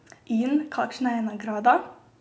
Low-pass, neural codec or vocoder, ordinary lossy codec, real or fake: none; none; none; real